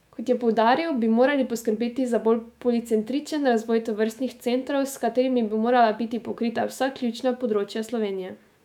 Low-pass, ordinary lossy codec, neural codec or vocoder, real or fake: 19.8 kHz; none; autoencoder, 48 kHz, 128 numbers a frame, DAC-VAE, trained on Japanese speech; fake